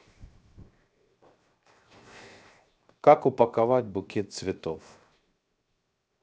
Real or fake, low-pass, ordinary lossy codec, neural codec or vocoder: fake; none; none; codec, 16 kHz, 0.7 kbps, FocalCodec